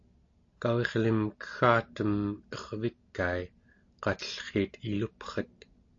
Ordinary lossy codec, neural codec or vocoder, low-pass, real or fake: AAC, 48 kbps; none; 7.2 kHz; real